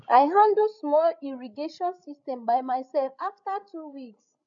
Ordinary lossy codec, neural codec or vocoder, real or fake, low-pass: none; codec, 16 kHz, 16 kbps, FreqCodec, larger model; fake; 7.2 kHz